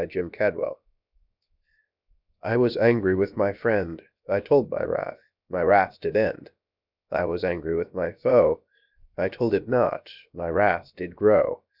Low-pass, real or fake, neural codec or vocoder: 5.4 kHz; fake; codec, 16 kHz, 0.7 kbps, FocalCodec